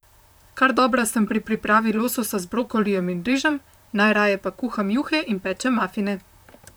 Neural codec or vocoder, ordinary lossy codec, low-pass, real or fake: vocoder, 44.1 kHz, 128 mel bands, Pupu-Vocoder; none; none; fake